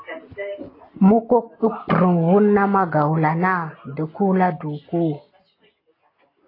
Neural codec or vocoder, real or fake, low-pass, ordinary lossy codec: none; real; 5.4 kHz; AAC, 24 kbps